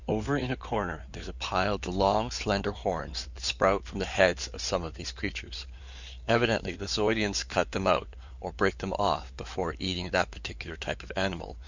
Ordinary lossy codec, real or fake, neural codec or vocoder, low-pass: Opus, 64 kbps; fake; codec, 16 kHz in and 24 kHz out, 2.2 kbps, FireRedTTS-2 codec; 7.2 kHz